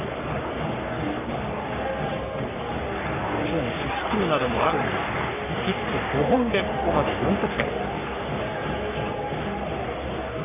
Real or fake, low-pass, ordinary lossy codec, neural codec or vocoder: fake; 3.6 kHz; none; codec, 44.1 kHz, 3.4 kbps, Pupu-Codec